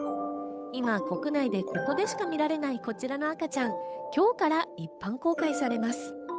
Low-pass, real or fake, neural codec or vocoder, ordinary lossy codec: none; fake; codec, 16 kHz, 8 kbps, FunCodec, trained on Chinese and English, 25 frames a second; none